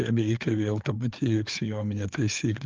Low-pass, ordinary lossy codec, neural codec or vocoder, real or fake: 7.2 kHz; Opus, 24 kbps; codec, 16 kHz, 4 kbps, FreqCodec, larger model; fake